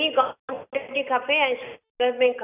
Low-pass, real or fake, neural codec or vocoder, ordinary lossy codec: 3.6 kHz; real; none; none